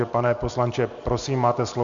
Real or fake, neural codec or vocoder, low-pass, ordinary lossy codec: real; none; 7.2 kHz; MP3, 64 kbps